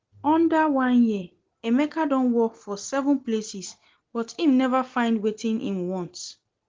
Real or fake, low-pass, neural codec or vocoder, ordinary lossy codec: real; 7.2 kHz; none; Opus, 16 kbps